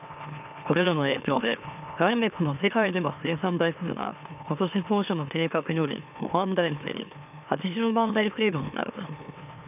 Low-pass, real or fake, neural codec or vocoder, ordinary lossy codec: 3.6 kHz; fake; autoencoder, 44.1 kHz, a latent of 192 numbers a frame, MeloTTS; none